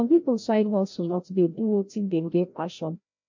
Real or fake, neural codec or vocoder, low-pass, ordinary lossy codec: fake; codec, 16 kHz, 0.5 kbps, FreqCodec, larger model; 7.2 kHz; MP3, 48 kbps